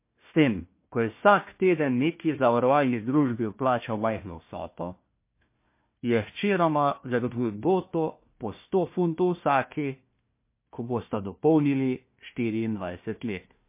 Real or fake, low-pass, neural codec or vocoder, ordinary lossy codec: fake; 3.6 kHz; codec, 16 kHz, 1 kbps, FunCodec, trained on Chinese and English, 50 frames a second; MP3, 24 kbps